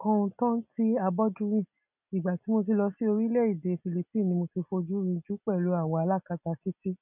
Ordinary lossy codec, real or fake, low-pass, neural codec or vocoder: none; real; 3.6 kHz; none